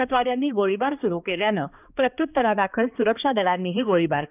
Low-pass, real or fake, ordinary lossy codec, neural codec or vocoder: 3.6 kHz; fake; none; codec, 16 kHz, 2 kbps, X-Codec, HuBERT features, trained on balanced general audio